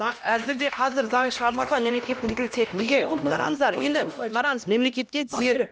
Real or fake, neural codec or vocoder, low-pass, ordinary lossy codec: fake; codec, 16 kHz, 1 kbps, X-Codec, HuBERT features, trained on LibriSpeech; none; none